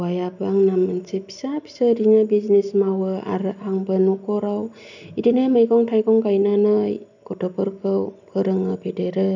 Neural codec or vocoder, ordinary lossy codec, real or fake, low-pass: none; none; real; 7.2 kHz